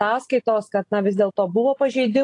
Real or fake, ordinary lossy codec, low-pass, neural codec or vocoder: real; AAC, 48 kbps; 10.8 kHz; none